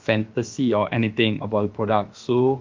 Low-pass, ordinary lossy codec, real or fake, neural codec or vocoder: 7.2 kHz; Opus, 32 kbps; fake; codec, 16 kHz, about 1 kbps, DyCAST, with the encoder's durations